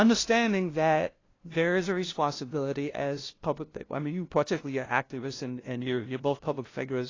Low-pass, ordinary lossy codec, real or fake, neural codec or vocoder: 7.2 kHz; AAC, 32 kbps; fake; codec, 16 kHz, 0.5 kbps, FunCodec, trained on LibriTTS, 25 frames a second